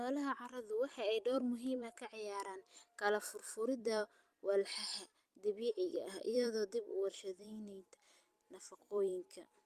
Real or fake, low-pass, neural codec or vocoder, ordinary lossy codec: fake; 14.4 kHz; vocoder, 44.1 kHz, 128 mel bands every 256 samples, BigVGAN v2; Opus, 32 kbps